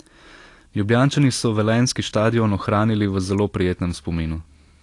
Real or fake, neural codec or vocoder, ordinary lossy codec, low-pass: real; none; AAC, 48 kbps; 10.8 kHz